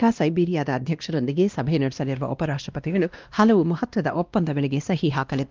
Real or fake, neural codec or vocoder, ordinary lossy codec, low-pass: fake; codec, 16 kHz, 1 kbps, X-Codec, WavLM features, trained on Multilingual LibriSpeech; Opus, 24 kbps; 7.2 kHz